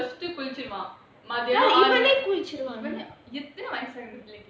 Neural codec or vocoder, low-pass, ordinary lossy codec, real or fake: none; none; none; real